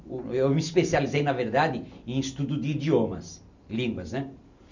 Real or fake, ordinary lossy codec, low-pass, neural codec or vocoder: real; none; 7.2 kHz; none